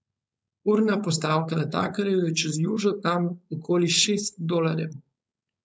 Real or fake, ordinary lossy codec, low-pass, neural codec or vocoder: fake; none; none; codec, 16 kHz, 4.8 kbps, FACodec